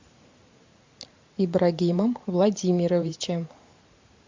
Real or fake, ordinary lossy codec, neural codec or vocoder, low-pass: fake; MP3, 64 kbps; vocoder, 44.1 kHz, 128 mel bands every 512 samples, BigVGAN v2; 7.2 kHz